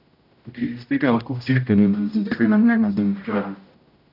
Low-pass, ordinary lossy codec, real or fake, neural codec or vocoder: 5.4 kHz; none; fake; codec, 16 kHz, 0.5 kbps, X-Codec, HuBERT features, trained on general audio